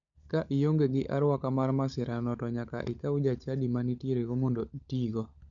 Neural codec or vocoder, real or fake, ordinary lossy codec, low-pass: codec, 16 kHz, 16 kbps, FunCodec, trained on LibriTTS, 50 frames a second; fake; none; 7.2 kHz